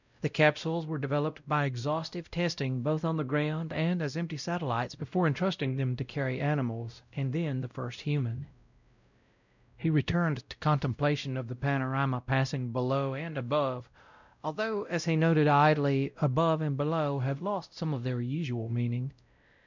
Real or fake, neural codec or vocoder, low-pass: fake; codec, 16 kHz, 0.5 kbps, X-Codec, WavLM features, trained on Multilingual LibriSpeech; 7.2 kHz